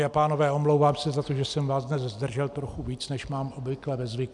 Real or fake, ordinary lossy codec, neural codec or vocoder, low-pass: real; MP3, 96 kbps; none; 10.8 kHz